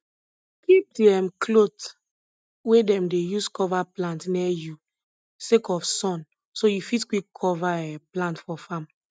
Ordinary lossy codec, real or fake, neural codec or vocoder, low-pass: none; real; none; none